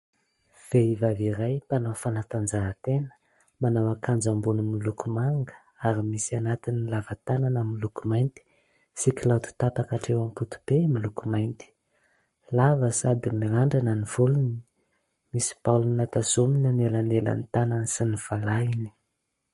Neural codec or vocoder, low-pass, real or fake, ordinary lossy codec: codec, 44.1 kHz, 7.8 kbps, Pupu-Codec; 19.8 kHz; fake; MP3, 48 kbps